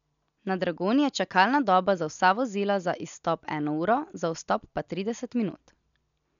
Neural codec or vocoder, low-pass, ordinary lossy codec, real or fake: none; 7.2 kHz; none; real